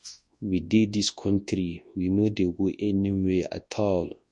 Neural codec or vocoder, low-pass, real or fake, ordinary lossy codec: codec, 24 kHz, 0.9 kbps, WavTokenizer, large speech release; 10.8 kHz; fake; MP3, 48 kbps